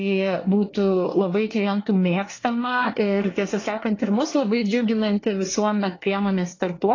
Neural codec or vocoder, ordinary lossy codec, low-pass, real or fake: codec, 24 kHz, 1 kbps, SNAC; AAC, 32 kbps; 7.2 kHz; fake